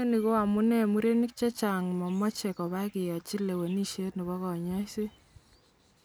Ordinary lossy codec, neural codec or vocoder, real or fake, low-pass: none; none; real; none